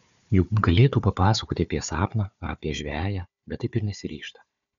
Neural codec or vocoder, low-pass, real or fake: codec, 16 kHz, 4 kbps, FunCodec, trained on Chinese and English, 50 frames a second; 7.2 kHz; fake